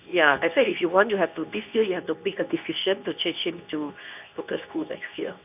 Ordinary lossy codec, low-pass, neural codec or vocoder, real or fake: none; 3.6 kHz; codec, 24 kHz, 0.9 kbps, WavTokenizer, medium speech release version 2; fake